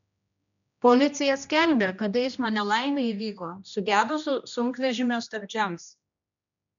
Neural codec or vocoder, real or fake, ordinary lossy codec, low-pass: codec, 16 kHz, 1 kbps, X-Codec, HuBERT features, trained on general audio; fake; MP3, 96 kbps; 7.2 kHz